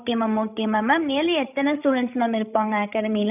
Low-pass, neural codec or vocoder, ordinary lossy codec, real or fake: 3.6 kHz; codec, 16 kHz, 16 kbps, FreqCodec, larger model; none; fake